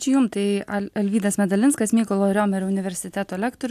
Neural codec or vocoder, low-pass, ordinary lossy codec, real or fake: none; 14.4 kHz; AAC, 96 kbps; real